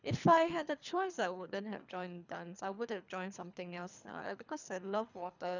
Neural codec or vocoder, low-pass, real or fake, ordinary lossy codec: codec, 24 kHz, 3 kbps, HILCodec; 7.2 kHz; fake; none